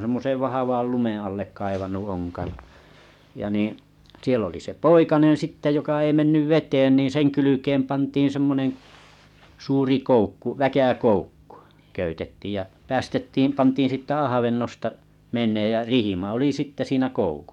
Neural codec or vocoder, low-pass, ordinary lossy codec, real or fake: codec, 44.1 kHz, 7.8 kbps, DAC; 19.8 kHz; MP3, 96 kbps; fake